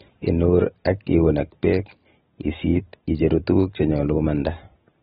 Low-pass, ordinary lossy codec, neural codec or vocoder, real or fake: 19.8 kHz; AAC, 16 kbps; none; real